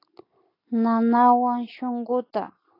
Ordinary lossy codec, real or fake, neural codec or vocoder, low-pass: AAC, 24 kbps; real; none; 5.4 kHz